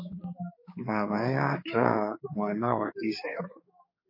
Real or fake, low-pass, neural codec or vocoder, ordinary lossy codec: fake; 5.4 kHz; codec, 16 kHz, 4 kbps, X-Codec, HuBERT features, trained on general audio; MP3, 24 kbps